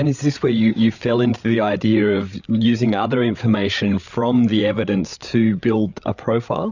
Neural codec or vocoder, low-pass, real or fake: codec, 16 kHz, 8 kbps, FreqCodec, larger model; 7.2 kHz; fake